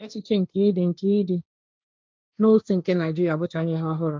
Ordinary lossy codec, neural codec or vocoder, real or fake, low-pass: none; codec, 16 kHz, 1.1 kbps, Voila-Tokenizer; fake; none